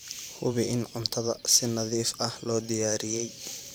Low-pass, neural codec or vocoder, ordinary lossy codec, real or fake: none; vocoder, 44.1 kHz, 128 mel bands every 512 samples, BigVGAN v2; none; fake